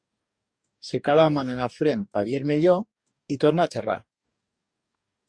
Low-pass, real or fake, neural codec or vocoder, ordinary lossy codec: 9.9 kHz; fake; codec, 44.1 kHz, 2.6 kbps, DAC; Opus, 64 kbps